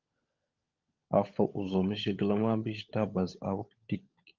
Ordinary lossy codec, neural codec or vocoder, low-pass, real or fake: Opus, 32 kbps; codec, 16 kHz, 16 kbps, FunCodec, trained on LibriTTS, 50 frames a second; 7.2 kHz; fake